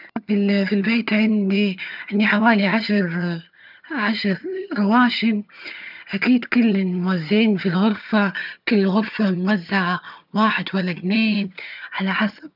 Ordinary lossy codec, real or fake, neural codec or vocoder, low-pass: none; fake; vocoder, 22.05 kHz, 80 mel bands, HiFi-GAN; 5.4 kHz